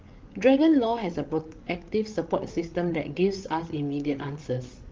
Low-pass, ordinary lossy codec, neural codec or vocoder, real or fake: 7.2 kHz; Opus, 32 kbps; codec, 16 kHz, 8 kbps, FreqCodec, larger model; fake